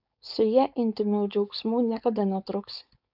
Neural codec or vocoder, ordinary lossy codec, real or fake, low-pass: codec, 16 kHz, 4.8 kbps, FACodec; AAC, 48 kbps; fake; 5.4 kHz